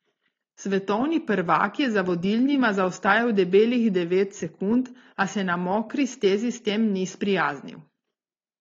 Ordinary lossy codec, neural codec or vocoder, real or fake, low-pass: AAC, 32 kbps; none; real; 7.2 kHz